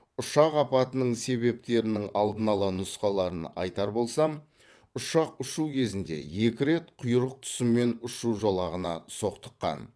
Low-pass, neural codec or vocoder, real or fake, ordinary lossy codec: none; vocoder, 22.05 kHz, 80 mel bands, WaveNeXt; fake; none